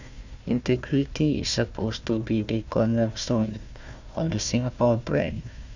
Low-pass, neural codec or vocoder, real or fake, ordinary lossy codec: 7.2 kHz; codec, 16 kHz, 1 kbps, FunCodec, trained on Chinese and English, 50 frames a second; fake; none